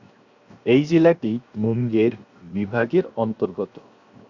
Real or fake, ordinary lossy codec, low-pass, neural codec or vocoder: fake; Opus, 64 kbps; 7.2 kHz; codec, 16 kHz, 0.7 kbps, FocalCodec